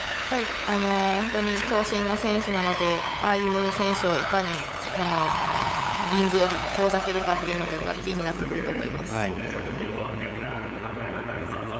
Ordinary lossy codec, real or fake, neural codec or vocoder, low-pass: none; fake; codec, 16 kHz, 8 kbps, FunCodec, trained on LibriTTS, 25 frames a second; none